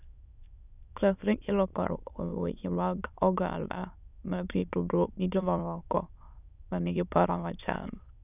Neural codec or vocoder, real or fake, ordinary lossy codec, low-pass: autoencoder, 22.05 kHz, a latent of 192 numbers a frame, VITS, trained on many speakers; fake; none; 3.6 kHz